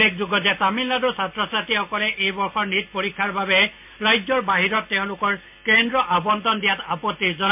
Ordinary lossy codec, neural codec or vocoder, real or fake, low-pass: MP3, 32 kbps; none; real; 3.6 kHz